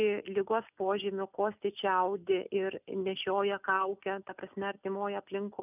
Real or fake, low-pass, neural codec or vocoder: real; 3.6 kHz; none